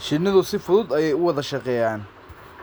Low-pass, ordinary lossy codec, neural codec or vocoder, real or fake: none; none; none; real